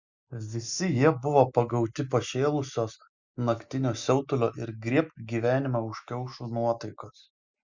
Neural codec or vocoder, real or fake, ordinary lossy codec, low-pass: none; real; Opus, 64 kbps; 7.2 kHz